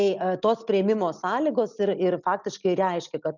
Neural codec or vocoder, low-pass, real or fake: none; 7.2 kHz; real